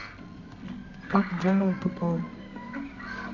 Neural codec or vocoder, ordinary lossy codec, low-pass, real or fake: codec, 24 kHz, 0.9 kbps, WavTokenizer, medium music audio release; none; 7.2 kHz; fake